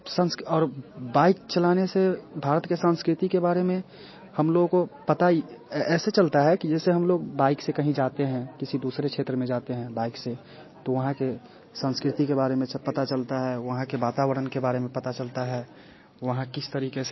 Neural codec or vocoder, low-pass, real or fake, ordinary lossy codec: none; 7.2 kHz; real; MP3, 24 kbps